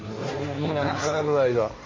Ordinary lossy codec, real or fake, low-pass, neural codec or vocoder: MP3, 32 kbps; fake; 7.2 kHz; codec, 16 kHz, 1.1 kbps, Voila-Tokenizer